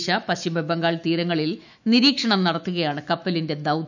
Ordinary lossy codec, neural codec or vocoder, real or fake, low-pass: none; autoencoder, 48 kHz, 128 numbers a frame, DAC-VAE, trained on Japanese speech; fake; 7.2 kHz